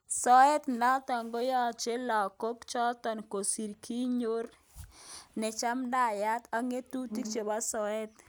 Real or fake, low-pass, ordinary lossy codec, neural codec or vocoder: real; none; none; none